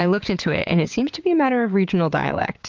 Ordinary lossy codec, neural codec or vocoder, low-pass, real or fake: Opus, 24 kbps; vocoder, 22.05 kHz, 80 mel bands, WaveNeXt; 7.2 kHz; fake